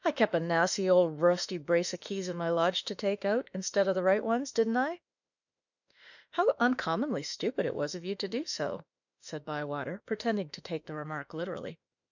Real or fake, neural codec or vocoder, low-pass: fake; autoencoder, 48 kHz, 32 numbers a frame, DAC-VAE, trained on Japanese speech; 7.2 kHz